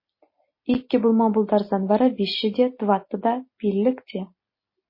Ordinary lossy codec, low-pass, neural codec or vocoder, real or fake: MP3, 24 kbps; 5.4 kHz; none; real